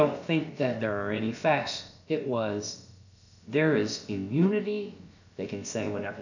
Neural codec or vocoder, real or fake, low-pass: codec, 16 kHz, about 1 kbps, DyCAST, with the encoder's durations; fake; 7.2 kHz